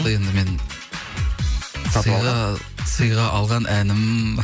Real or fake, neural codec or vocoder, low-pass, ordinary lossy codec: real; none; none; none